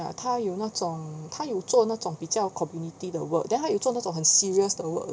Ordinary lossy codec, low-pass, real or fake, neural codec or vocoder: none; none; real; none